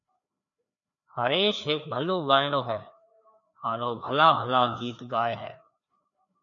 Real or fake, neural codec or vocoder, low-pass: fake; codec, 16 kHz, 2 kbps, FreqCodec, larger model; 7.2 kHz